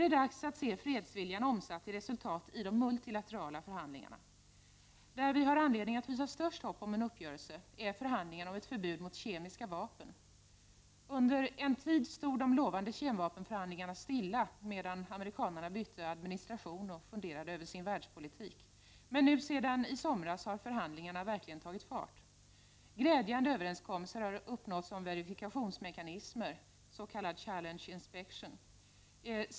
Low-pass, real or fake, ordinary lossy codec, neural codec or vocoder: none; real; none; none